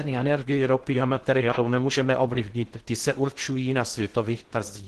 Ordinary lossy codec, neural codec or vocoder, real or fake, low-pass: Opus, 16 kbps; codec, 16 kHz in and 24 kHz out, 0.6 kbps, FocalCodec, streaming, 2048 codes; fake; 10.8 kHz